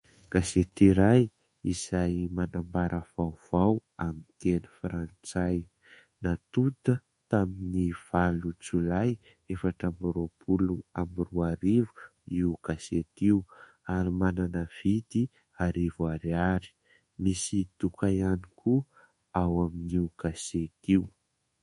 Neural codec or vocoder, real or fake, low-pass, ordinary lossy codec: codec, 24 kHz, 1.2 kbps, DualCodec; fake; 10.8 kHz; MP3, 48 kbps